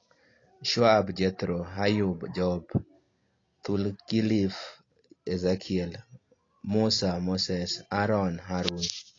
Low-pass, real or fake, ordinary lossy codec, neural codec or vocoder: 7.2 kHz; real; AAC, 32 kbps; none